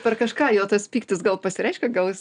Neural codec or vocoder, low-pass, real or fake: none; 9.9 kHz; real